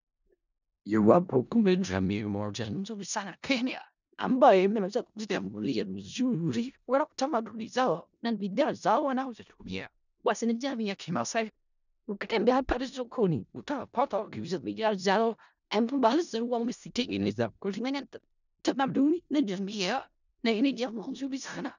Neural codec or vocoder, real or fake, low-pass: codec, 16 kHz in and 24 kHz out, 0.4 kbps, LongCat-Audio-Codec, four codebook decoder; fake; 7.2 kHz